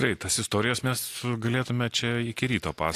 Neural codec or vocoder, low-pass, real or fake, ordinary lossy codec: none; 14.4 kHz; real; AAC, 64 kbps